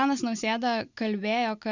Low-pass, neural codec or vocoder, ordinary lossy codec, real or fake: 7.2 kHz; none; Opus, 64 kbps; real